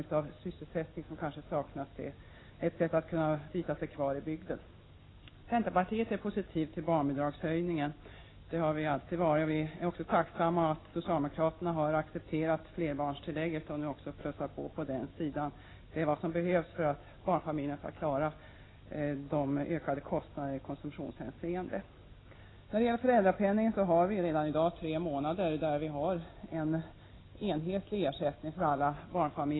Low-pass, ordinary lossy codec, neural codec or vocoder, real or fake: 7.2 kHz; AAC, 16 kbps; none; real